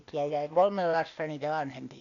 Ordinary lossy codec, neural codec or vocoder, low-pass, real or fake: MP3, 96 kbps; codec, 16 kHz, 0.8 kbps, ZipCodec; 7.2 kHz; fake